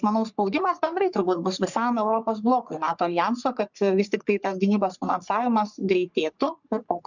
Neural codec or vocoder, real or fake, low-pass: codec, 44.1 kHz, 3.4 kbps, Pupu-Codec; fake; 7.2 kHz